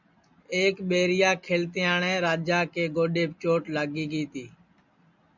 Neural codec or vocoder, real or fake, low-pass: none; real; 7.2 kHz